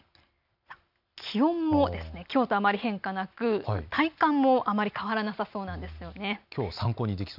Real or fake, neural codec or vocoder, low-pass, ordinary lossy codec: real; none; 5.4 kHz; none